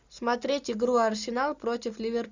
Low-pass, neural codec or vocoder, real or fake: 7.2 kHz; none; real